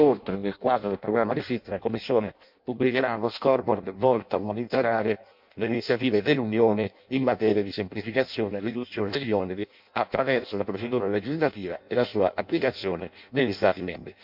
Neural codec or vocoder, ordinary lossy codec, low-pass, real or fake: codec, 16 kHz in and 24 kHz out, 0.6 kbps, FireRedTTS-2 codec; MP3, 48 kbps; 5.4 kHz; fake